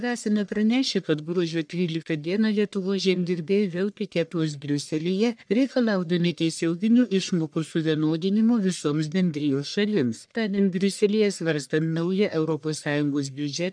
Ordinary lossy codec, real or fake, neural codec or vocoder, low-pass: MP3, 96 kbps; fake; codec, 44.1 kHz, 1.7 kbps, Pupu-Codec; 9.9 kHz